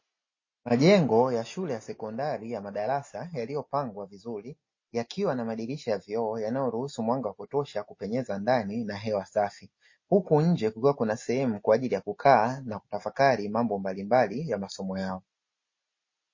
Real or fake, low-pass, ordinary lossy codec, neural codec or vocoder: real; 7.2 kHz; MP3, 32 kbps; none